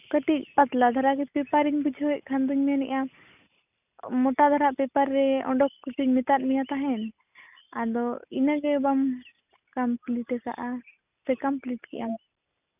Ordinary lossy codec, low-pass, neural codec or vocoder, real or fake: none; 3.6 kHz; none; real